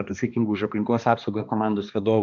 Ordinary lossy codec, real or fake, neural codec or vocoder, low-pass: AAC, 64 kbps; fake; codec, 16 kHz, 2 kbps, X-Codec, HuBERT features, trained on balanced general audio; 7.2 kHz